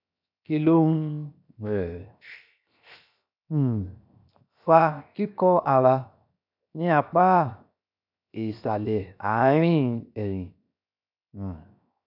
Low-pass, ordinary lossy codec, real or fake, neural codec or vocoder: 5.4 kHz; none; fake; codec, 16 kHz, 0.7 kbps, FocalCodec